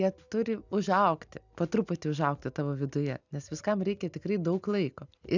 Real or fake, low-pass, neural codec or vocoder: real; 7.2 kHz; none